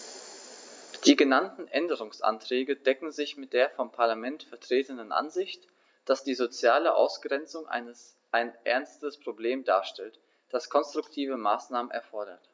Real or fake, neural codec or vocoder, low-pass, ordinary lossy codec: real; none; none; none